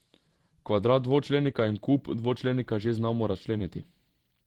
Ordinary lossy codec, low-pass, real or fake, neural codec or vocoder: Opus, 16 kbps; 19.8 kHz; fake; vocoder, 44.1 kHz, 128 mel bands every 512 samples, BigVGAN v2